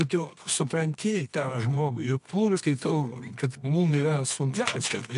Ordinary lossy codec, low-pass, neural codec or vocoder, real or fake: MP3, 64 kbps; 10.8 kHz; codec, 24 kHz, 0.9 kbps, WavTokenizer, medium music audio release; fake